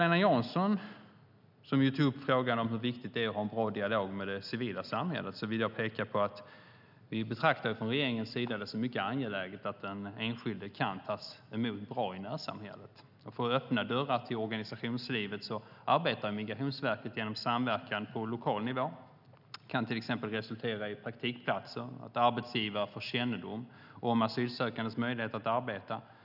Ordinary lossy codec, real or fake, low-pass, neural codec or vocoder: none; real; 5.4 kHz; none